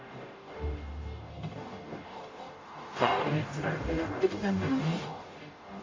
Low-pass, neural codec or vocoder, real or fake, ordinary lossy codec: 7.2 kHz; codec, 44.1 kHz, 0.9 kbps, DAC; fake; AAC, 32 kbps